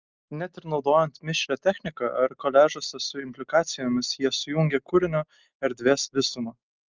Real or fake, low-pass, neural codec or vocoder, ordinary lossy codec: real; 7.2 kHz; none; Opus, 24 kbps